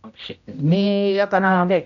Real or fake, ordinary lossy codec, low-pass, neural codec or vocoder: fake; none; 7.2 kHz; codec, 16 kHz, 0.5 kbps, X-Codec, HuBERT features, trained on general audio